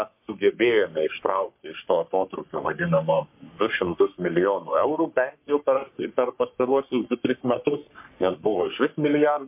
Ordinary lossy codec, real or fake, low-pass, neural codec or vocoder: MP3, 32 kbps; fake; 3.6 kHz; codec, 44.1 kHz, 3.4 kbps, Pupu-Codec